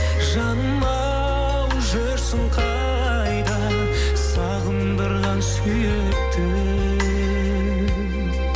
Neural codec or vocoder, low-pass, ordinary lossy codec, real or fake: none; none; none; real